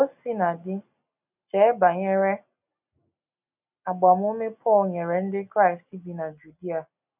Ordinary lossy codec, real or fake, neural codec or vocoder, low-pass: none; real; none; 3.6 kHz